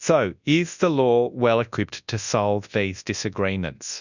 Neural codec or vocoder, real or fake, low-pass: codec, 24 kHz, 0.9 kbps, WavTokenizer, large speech release; fake; 7.2 kHz